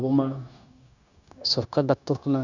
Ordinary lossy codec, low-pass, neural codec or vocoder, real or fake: none; 7.2 kHz; codec, 16 kHz, 0.9 kbps, LongCat-Audio-Codec; fake